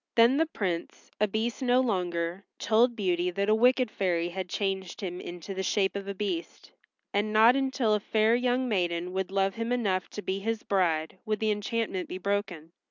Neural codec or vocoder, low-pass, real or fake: none; 7.2 kHz; real